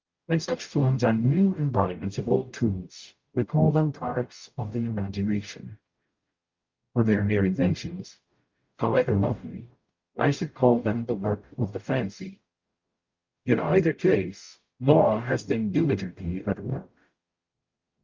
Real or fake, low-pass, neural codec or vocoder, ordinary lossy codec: fake; 7.2 kHz; codec, 44.1 kHz, 0.9 kbps, DAC; Opus, 24 kbps